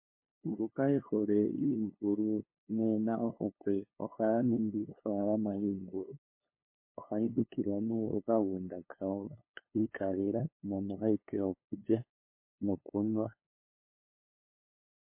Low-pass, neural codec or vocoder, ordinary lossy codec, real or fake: 3.6 kHz; codec, 16 kHz, 2 kbps, FunCodec, trained on LibriTTS, 25 frames a second; MP3, 24 kbps; fake